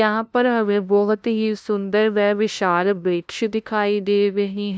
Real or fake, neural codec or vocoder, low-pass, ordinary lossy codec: fake; codec, 16 kHz, 0.5 kbps, FunCodec, trained on LibriTTS, 25 frames a second; none; none